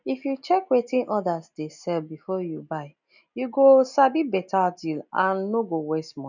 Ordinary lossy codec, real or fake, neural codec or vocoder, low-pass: none; real; none; 7.2 kHz